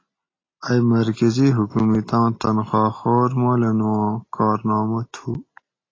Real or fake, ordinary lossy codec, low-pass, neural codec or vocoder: real; AAC, 32 kbps; 7.2 kHz; none